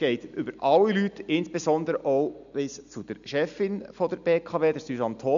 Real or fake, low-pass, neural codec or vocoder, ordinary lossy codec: real; 7.2 kHz; none; none